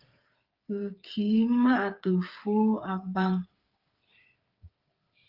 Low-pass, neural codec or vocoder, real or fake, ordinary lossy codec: 5.4 kHz; codec, 16 kHz, 8 kbps, FreqCodec, smaller model; fake; Opus, 32 kbps